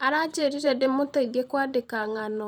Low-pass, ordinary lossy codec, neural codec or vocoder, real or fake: 19.8 kHz; none; vocoder, 44.1 kHz, 128 mel bands every 512 samples, BigVGAN v2; fake